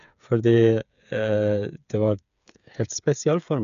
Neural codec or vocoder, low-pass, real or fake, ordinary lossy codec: codec, 16 kHz, 8 kbps, FreqCodec, smaller model; 7.2 kHz; fake; none